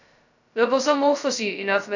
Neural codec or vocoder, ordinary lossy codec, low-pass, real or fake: codec, 16 kHz, 0.2 kbps, FocalCodec; none; 7.2 kHz; fake